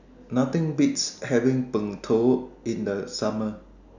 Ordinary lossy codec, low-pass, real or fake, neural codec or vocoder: none; 7.2 kHz; real; none